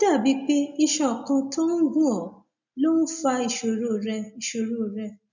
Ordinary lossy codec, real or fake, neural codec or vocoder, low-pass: none; real; none; 7.2 kHz